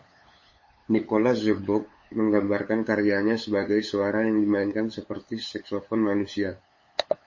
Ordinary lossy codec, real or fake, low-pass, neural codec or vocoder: MP3, 32 kbps; fake; 7.2 kHz; codec, 16 kHz, 8 kbps, FunCodec, trained on LibriTTS, 25 frames a second